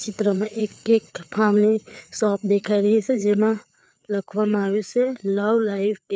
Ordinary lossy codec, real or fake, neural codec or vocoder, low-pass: none; fake; codec, 16 kHz, 4 kbps, FreqCodec, larger model; none